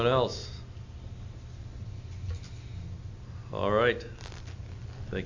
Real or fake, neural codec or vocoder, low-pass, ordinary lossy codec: real; none; 7.2 kHz; AAC, 48 kbps